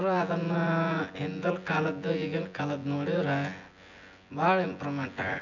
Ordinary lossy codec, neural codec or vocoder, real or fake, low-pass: none; vocoder, 24 kHz, 100 mel bands, Vocos; fake; 7.2 kHz